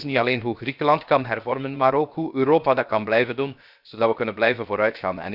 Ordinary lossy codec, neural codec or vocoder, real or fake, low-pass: none; codec, 16 kHz, 0.7 kbps, FocalCodec; fake; 5.4 kHz